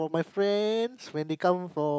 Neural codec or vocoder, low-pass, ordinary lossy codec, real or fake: none; none; none; real